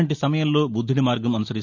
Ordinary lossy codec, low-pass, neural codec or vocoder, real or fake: none; 7.2 kHz; none; real